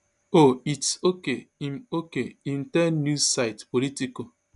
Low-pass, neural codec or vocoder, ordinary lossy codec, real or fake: 10.8 kHz; none; none; real